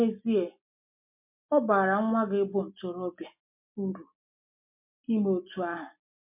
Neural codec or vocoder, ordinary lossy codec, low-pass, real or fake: none; MP3, 32 kbps; 3.6 kHz; real